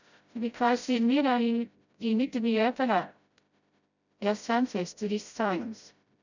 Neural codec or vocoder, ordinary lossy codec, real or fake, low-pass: codec, 16 kHz, 0.5 kbps, FreqCodec, smaller model; none; fake; 7.2 kHz